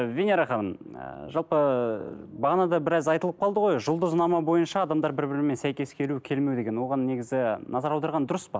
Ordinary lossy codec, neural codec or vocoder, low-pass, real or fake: none; none; none; real